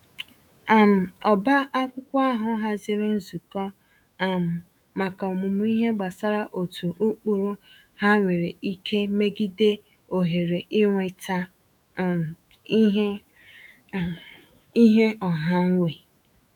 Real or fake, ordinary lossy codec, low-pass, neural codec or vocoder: fake; none; 19.8 kHz; autoencoder, 48 kHz, 128 numbers a frame, DAC-VAE, trained on Japanese speech